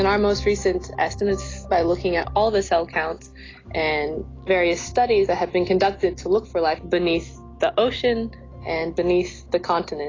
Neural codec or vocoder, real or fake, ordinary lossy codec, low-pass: none; real; AAC, 32 kbps; 7.2 kHz